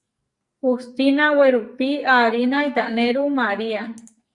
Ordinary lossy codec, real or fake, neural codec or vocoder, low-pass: Opus, 64 kbps; fake; codec, 44.1 kHz, 2.6 kbps, SNAC; 10.8 kHz